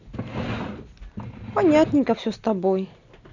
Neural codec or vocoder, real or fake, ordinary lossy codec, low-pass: none; real; none; 7.2 kHz